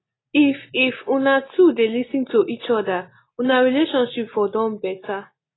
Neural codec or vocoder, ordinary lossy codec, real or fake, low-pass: none; AAC, 16 kbps; real; 7.2 kHz